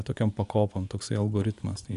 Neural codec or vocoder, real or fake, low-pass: vocoder, 24 kHz, 100 mel bands, Vocos; fake; 10.8 kHz